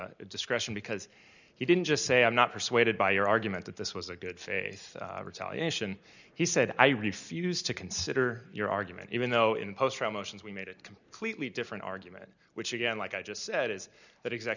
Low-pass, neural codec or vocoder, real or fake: 7.2 kHz; none; real